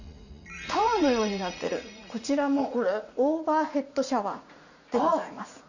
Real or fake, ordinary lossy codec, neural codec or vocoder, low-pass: fake; none; vocoder, 44.1 kHz, 80 mel bands, Vocos; 7.2 kHz